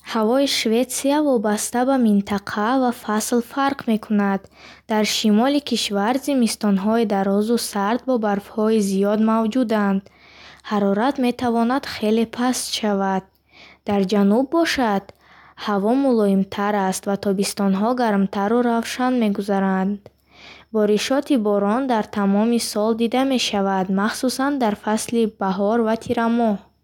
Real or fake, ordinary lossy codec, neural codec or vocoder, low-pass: real; none; none; 19.8 kHz